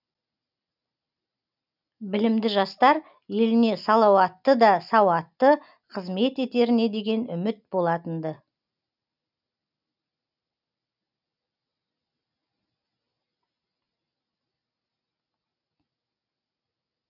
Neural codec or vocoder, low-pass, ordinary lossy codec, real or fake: none; 5.4 kHz; none; real